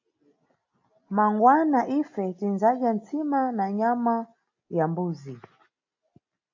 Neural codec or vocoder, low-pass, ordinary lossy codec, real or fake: none; 7.2 kHz; AAC, 48 kbps; real